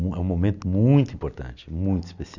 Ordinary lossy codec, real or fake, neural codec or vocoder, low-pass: none; real; none; 7.2 kHz